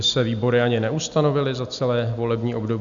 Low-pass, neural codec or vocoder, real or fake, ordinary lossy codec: 7.2 kHz; none; real; MP3, 96 kbps